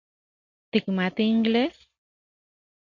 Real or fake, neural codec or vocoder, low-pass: real; none; 7.2 kHz